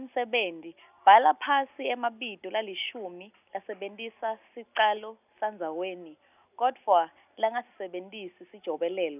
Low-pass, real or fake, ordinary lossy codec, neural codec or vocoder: 3.6 kHz; real; none; none